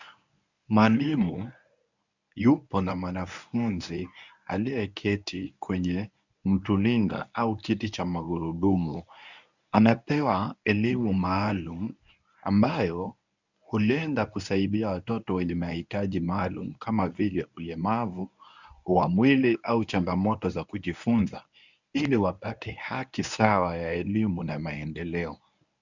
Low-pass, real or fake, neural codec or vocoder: 7.2 kHz; fake; codec, 24 kHz, 0.9 kbps, WavTokenizer, medium speech release version 1